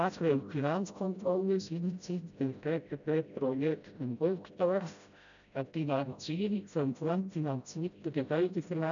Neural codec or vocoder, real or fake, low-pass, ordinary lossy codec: codec, 16 kHz, 0.5 kbps, FreqCodec, smaller model; fake; 7.2 kHz; none